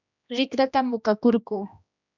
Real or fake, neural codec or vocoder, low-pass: fake; codec, 16 kHz, 1 kbps, X-Codec, HuBERT features, trained on general audio; 7.2 kHz